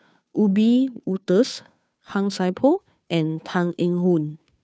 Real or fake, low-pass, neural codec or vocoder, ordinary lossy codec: fake; none; codec, 16 kHz, 2 kbps, FunCodec, trained on Chinese and English, 25 frames a second; none